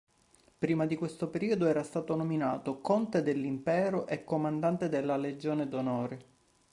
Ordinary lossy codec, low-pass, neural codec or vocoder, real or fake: Opus, 64 kbps; 10.8 kHz; none; real